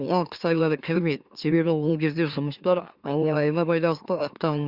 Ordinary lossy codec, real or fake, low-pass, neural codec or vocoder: Opus, 64 kbps; fake; 5.4 kHz; autoencoder, 44.1 kHz, a latent of 192 numbers a frame, MeloTTS